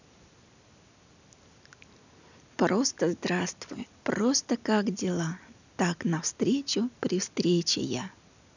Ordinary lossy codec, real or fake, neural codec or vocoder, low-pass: none; real; none; 7.2 kHz